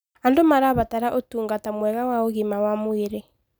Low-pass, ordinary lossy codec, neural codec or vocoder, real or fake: none; none; none; real